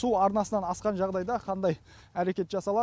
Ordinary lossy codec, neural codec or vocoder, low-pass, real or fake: none; none; none; real